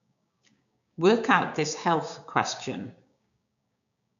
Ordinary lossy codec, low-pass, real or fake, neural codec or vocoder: none; 7.2 kHz; fake; codec, 16 kHz, 6 kbps, DAC